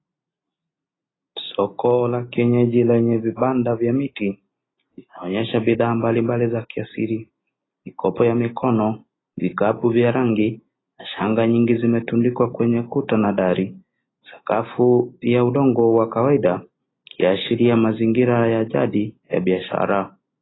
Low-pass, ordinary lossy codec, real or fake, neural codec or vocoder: 7.2 kHz; AAC, 16 kbps; real; none